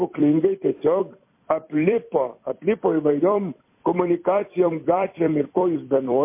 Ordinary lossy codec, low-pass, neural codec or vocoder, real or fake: MP3, 24 kbps; 3.6 kHz; vocoder, 44.1 kHz, 128 mel bands every 256 samples, BigVGAN v2; fake